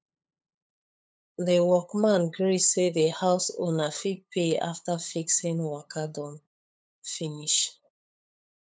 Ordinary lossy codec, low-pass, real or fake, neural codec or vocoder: none; none; fake; codec, 16 kHz, 8 kbps, FunCodec, trained on LibriTTS, 25 frames a second